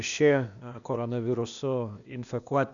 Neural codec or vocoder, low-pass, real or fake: codec, 16 kHz, 0.8 kbps, ZipCodec; 7.2 kHz; fake